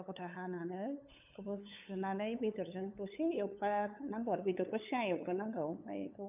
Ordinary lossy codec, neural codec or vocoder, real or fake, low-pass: none; codec, 16 kHz, 8 kbps, FunCodec, trained on LibriTTS, 25 frames a second; fake; 3.6 kHz